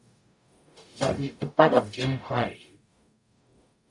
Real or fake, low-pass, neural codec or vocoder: fake; 10.8 kHz; codec, 44.1 kHz, 0.9 kbps, DAC